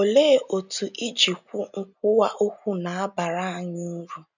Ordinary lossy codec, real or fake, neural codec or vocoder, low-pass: none; real; none; 7.2 kHz